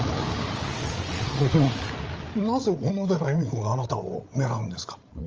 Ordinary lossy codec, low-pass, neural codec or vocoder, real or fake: Opus, 24 kbps; 7.2 kHz; codec, 16 kHz, 8 kbps, FreqCodec, larger model; fake